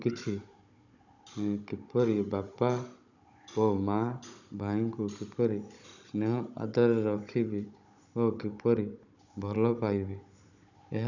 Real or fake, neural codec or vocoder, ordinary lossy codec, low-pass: fake; codec, 16 kHz, 16 kbps, FunCodec, trained on Chinese and English, 50 frames a second; none; 7.2 kHz